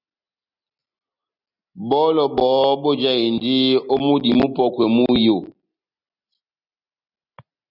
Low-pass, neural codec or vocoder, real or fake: 5.4 kHz; none; real